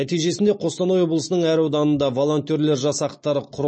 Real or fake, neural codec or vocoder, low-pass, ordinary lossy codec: real; none; 9.9 kHz; MP3, 32 kbps